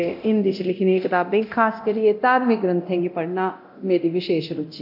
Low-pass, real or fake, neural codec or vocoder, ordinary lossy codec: 5.4 kHz; fake; codec, 24 kHz, 0.9 kbps, DualCodec; AAC, 48 kbps